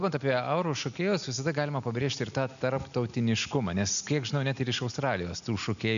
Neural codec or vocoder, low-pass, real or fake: none; 7.2 kHz; real